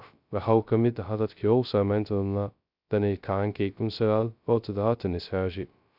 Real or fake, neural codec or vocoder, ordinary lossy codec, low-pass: fake; codec, 16 kHz, 0.2 kbps, FocalCodec; none; 5.4 kHz